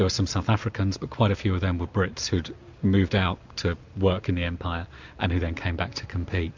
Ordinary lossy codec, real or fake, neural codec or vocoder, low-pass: MP3, 64 kbps; real; none; 7.2 kHz